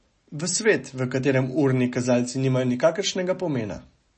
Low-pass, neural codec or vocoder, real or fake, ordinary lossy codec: 10.8 kHz; none; real; MP3, 32 kbps